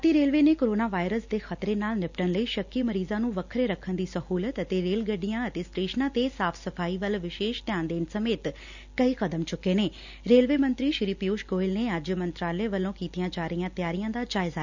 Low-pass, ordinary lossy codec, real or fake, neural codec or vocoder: 7.2 kHz; none; real; none